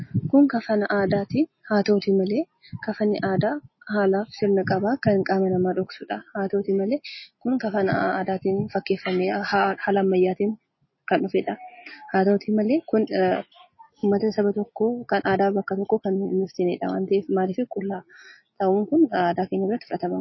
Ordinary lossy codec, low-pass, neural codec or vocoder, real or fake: MP3, 24 kbps; 7.2 kHz; none; real